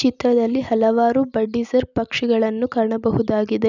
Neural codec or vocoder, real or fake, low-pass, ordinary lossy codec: none; real; 7.2 kHz; none